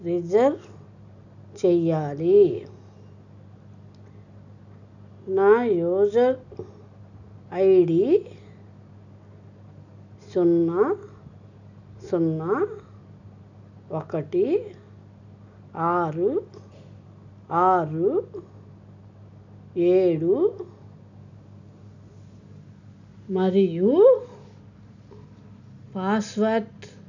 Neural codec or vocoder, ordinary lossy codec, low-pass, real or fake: none; none; 7.2 kHz; real